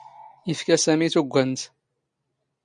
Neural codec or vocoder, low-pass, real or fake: none; 9.9 kHz; real